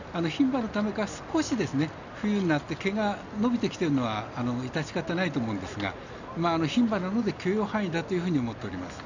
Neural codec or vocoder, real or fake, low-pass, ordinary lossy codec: none; real; 7.2 kHz; none